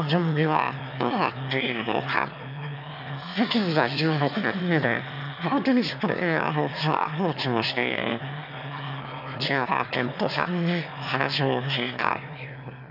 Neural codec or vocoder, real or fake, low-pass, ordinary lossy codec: autoencoder, 22.05 kHz, a latent of 192 numbers a frame, VITS, trained on one speaker; fake; 5.4 kHz; none